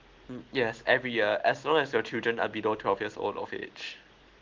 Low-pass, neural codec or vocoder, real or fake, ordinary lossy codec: 7.2 kHz; none; real; Opus, 16 kbps